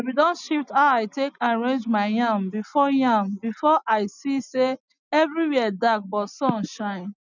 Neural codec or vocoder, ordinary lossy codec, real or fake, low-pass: none; none; real; 7.2 kHz